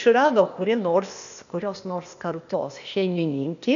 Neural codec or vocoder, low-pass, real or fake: codec, 16 kHz, 0.8 kbps, ZipCodec; 7.2 kHz; fake